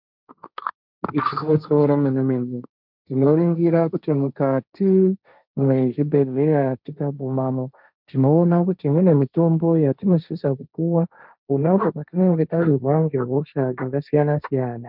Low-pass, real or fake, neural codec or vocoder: 5.4 kHz; fake; codec, 16 kHz, 1.1 kbps, Voila-Tokenizer